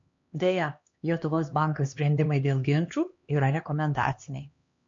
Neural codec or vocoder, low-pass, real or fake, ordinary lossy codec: codec, 16 kHz, 2 kbps, X-Codec, HuBERT features, trained on LibriSpeech; 7.2 kHz; fake; MP3, 48 kbps